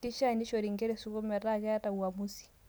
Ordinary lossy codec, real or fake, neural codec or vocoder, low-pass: none; real; none; none